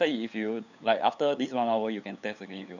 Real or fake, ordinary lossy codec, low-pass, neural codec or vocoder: fake; none; 7.2 kHz; codec, 16 kHz, 8 kbps, FunCodec, trained on LibriTTS, 25 frames a second